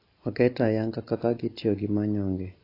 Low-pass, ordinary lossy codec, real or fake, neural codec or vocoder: 5.4 kHz; AAC, 32 kbps; real; none